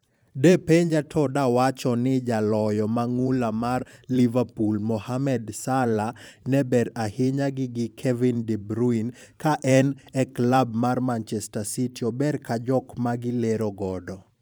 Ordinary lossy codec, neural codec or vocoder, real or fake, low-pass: none; vocoder, 44.1 kHz, 128 mel bands every 512 samples, BigVGAN v2; fake; none